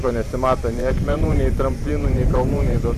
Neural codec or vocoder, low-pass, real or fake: vocoder, 44.1 kHz, 128 mel bands every 512 samples, BigVGAN v2; 14.4 kHz; fake